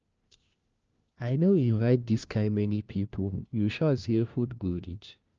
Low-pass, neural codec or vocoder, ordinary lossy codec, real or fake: 7.2 kHz; codec, 16 kHz, 1 kbps, FunCodec, trained on LibriTTS, 50 frames a second; Opus, 24 kbps; fake